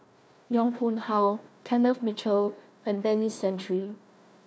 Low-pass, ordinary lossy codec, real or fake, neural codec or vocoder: none; none; fake; codec, 16 kHz, 1 kbps, FunCodec, trained on Chinese and English, 50 frames a second